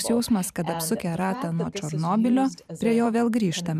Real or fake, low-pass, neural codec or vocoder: fake; 14.4 kHz; vocoder, 44.1 kHz, 128 mel bands every 512 samples, BigVGAN v2